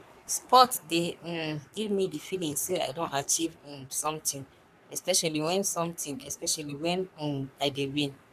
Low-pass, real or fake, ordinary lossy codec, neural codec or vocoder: 14.4 kHz; fake; none; codec, 44.1 kHz, 3.4 kbps, Pupu-Codec